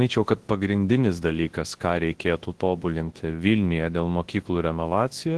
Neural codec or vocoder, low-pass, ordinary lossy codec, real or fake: codec, 24 kHz, 0.9 kbps, WavTokenizer, large speech release; 10.8 kHz; Opus, 16 kbps; fake